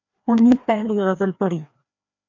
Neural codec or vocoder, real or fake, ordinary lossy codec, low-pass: codec, 16 kHz, 2 kbps, FreqCodec, larger model; fake; MP3, 64 kbps; 7.2 kHz